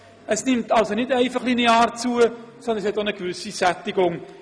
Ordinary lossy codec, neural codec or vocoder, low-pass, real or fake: none; none; none; real